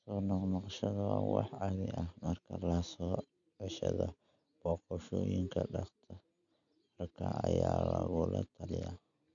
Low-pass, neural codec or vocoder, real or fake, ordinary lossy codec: 7.2 kHz; none; real; none